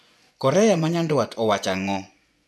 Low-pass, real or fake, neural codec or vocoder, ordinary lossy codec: none; fake; vocoder, 24 kHz, 100 mel bands, Vocos; none